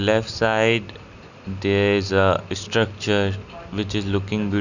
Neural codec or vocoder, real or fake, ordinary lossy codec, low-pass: none; real; none; 7.2 kHz